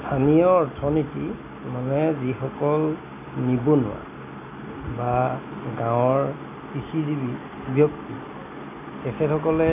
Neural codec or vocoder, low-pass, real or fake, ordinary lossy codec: none; 3.6 kHz; real; AAC, 32 kbps